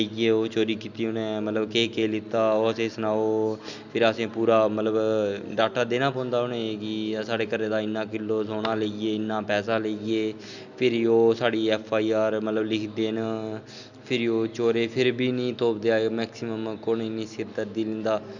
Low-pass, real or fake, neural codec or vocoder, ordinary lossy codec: 7.2 kHz; real; none; none